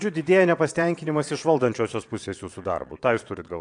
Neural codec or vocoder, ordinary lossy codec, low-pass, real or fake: vocoder, 22.05 kHz, 80 mel bands, Vocos; AAC, 64 kbps; 9.9 kHz; fake